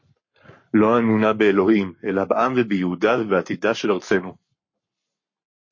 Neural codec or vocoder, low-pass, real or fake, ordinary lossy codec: codec, 44.1 kHz, 7.8 kbps, Pupu-Codec; 7.2 kHz; fake; MP3, 32 kbps